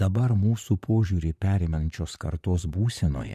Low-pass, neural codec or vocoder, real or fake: 14.4 kHz; vocoder, 44.1 kHz, 128 mel bands, Pupu-Vocoder; fake